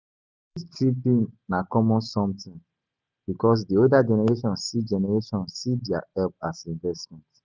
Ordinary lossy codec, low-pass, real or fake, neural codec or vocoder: none; none; real; none